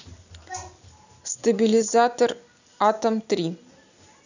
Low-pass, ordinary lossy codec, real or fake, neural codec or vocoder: 7.2 kHz; none; real; none